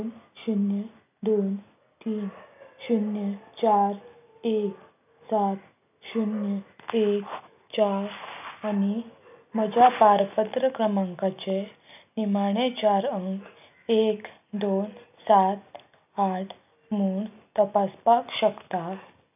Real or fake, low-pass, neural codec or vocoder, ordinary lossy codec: real; 3.6 kHz; none; none